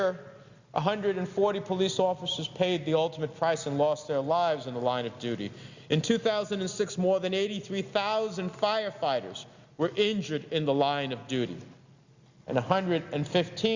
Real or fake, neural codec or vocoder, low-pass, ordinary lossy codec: real; none; 7.2 kHz; Opus, 64 kbps